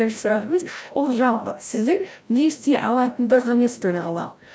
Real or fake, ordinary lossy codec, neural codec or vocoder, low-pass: fake; none; codec, 16 kHz, 0.5 kbps, FreqCodec, larger model; none